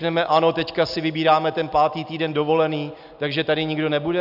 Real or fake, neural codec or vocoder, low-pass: real; none; 5.4 kHz